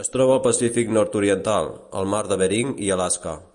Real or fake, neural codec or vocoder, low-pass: real; none; 10.8 kHz